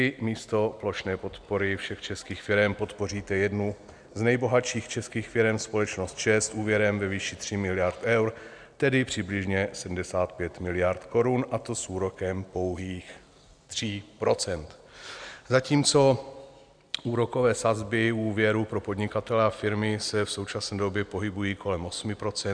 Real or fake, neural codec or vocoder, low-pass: real; none; 9.9 kHz